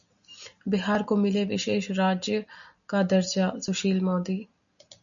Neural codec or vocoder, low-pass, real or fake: none; 7.2 kHz; real